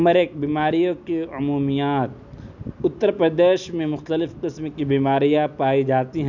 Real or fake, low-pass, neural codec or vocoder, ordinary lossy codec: real; 7.2 kHz; none; none